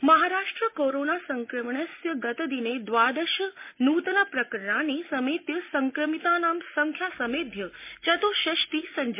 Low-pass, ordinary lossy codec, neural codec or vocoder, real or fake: 3.6 kHz; MP3, 24 kbps; none; real